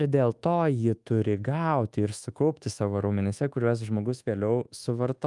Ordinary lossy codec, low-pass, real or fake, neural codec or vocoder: Opus, 32 kbps; 10.8 kHz; fake; codec, 24 kHz, 1.2 kbps, DualCodec